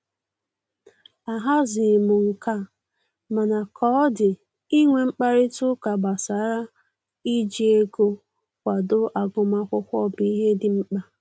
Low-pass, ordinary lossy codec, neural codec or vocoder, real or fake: none; none; none; real